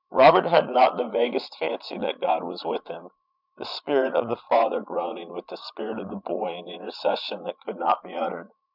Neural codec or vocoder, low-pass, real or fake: vocoder, 22.05 kHz, 80 mel bands, Vocos; 5.4 kHz; fake